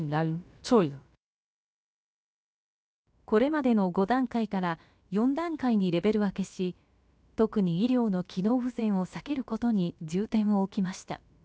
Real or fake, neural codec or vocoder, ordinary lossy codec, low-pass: fake; codec, 16 kHz, about 1 kbps, DyCAST, with the encoder's durations; none; none